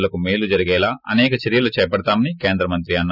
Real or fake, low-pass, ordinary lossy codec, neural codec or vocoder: real; 5.4 kHz; none; none